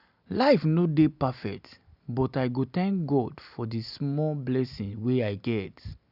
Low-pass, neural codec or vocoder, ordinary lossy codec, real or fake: 5.4 kHz; none; none; real